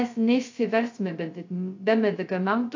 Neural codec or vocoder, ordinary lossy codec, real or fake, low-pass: codec, 16 kHz, 0.2 kbps, FocalCodec; AAC, 48 kbps; fake; 7.2 kHz